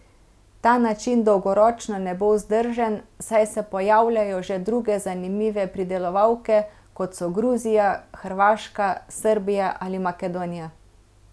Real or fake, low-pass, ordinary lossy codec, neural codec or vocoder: real; none; none; none